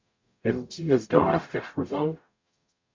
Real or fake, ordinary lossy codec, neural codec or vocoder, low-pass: fake; MP3, 48 kbps; codec, 44.1 kHz, 0.9 kbps, DAC; 7.2 kHz